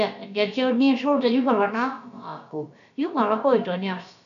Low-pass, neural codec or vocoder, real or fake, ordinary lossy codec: 7.2 kHz; codec, 16 kHz, about 1 kbps, DyCAST, with the encoder's durations; fake; none